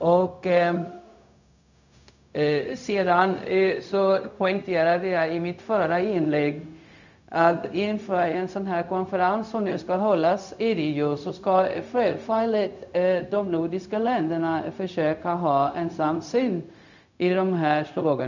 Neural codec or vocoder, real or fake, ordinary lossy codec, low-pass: codec, 16 kHz, 0.4 kbps, LongCat-Audio-Codec; fake; none; 7.2 kHz